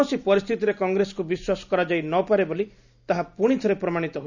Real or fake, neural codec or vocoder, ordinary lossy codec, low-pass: real; none; none; 7.2 kHz